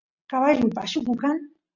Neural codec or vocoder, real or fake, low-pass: none; real; 7.2 kHz